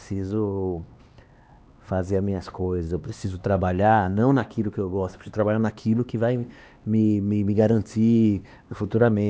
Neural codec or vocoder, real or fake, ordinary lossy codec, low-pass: codec, 16 kHz, 2 kbps, X-Codec, HuBERT features, trained on LibriSpeech; fake; none; none